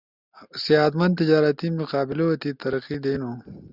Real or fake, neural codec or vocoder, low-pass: real; none; 5.4 kHz